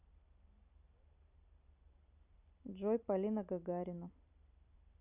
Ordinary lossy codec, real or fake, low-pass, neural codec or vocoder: none; fake; 3.6 kHz; vocoder, 44.1 kHz, 128 mel bands every 256 samples, BigVGAN v2